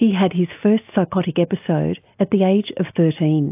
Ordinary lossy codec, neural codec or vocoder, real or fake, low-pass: AAC, 32 kbps; none; real; 3.6 kHz